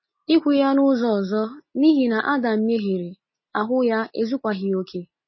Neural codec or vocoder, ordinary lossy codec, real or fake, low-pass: none; MP3, 24 kbps; real; 7.2 kHz